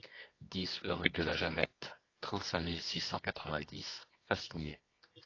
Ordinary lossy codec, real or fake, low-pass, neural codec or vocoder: AAC, 32 kbps; fake; 7.2 kHz; codec, 24 kHz, 0.9 kbps, WavTokenizer, medium music audio release